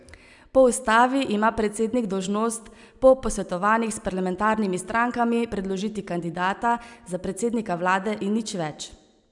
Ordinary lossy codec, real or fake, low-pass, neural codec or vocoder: none; real; 10.8 kHz; none